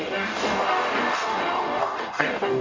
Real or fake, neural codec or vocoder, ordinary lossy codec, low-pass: fake; codec, 44.1 kHz, 0.9 kbps, DAC; MP3, 48 kbps; 7.2 kHz